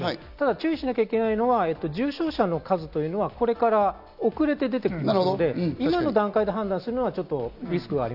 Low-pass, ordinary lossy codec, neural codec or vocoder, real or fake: 5.4 kHz; none; none; real